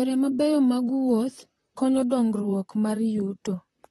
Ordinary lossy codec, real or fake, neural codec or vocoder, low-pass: AAC, 32 kbps; fake; vocoder, 44.1 kHz, 128 mel bands, Pupu-Vocoder; 19.8 kHz